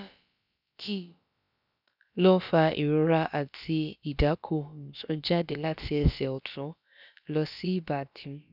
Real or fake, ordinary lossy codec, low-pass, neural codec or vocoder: fake; none; 5.4 kHz; codec, 16 kHz, about 1 kbps, DyCAST, with the encoder's durations